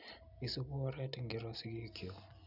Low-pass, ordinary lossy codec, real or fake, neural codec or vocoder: 5.4 kHz; none; real; none